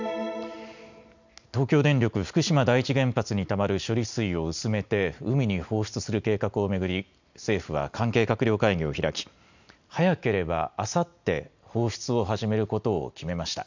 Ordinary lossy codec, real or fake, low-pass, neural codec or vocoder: none; real; 7.2 kHz; none